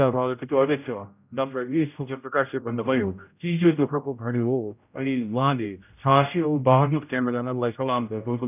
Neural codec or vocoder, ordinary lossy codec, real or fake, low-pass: codec, 16 kHz, 0.5 kbps, X-Codec, HuBERT features, trained on general audio; none; fake; 3.6 kHz